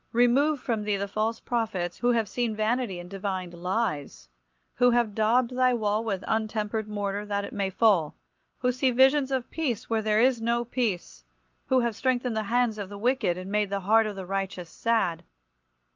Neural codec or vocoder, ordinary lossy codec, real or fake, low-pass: none; Opus, 24 kbps; real; 7.2 kHz